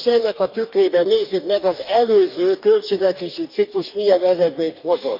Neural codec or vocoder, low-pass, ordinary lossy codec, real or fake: codec, 44.1 kHz, 2.6 kbps, DAC; 5.4 kHz; none; fake